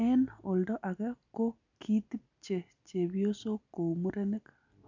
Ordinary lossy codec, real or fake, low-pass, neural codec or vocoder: none; real; 7.2 kHz; none